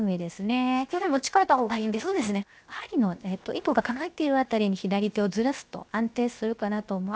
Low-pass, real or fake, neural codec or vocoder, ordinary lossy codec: none; fake; codec, 16 kHz, 0.7 kbps, FocalCodec; none